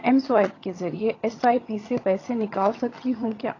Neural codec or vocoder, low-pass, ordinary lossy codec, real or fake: vocoder, 22.05 kHz, 80 mel bands, HiFi-GAN; 7.2 kHz; AAC, 32 kbps; fake